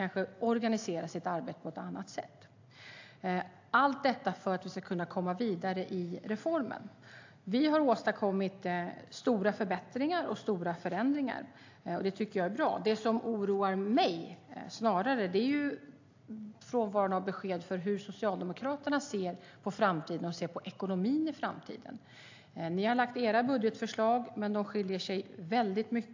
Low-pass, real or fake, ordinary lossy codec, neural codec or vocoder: 7.2 kHz; real; AAC, 48 kbps; none